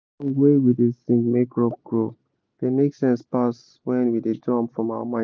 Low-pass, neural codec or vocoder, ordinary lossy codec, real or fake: none; none; none; real